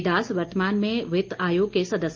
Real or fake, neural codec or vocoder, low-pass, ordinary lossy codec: real; none; 7.2 kHz; Opus, 24 kbps